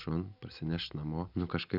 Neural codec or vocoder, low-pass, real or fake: none; 5.4 kHz; real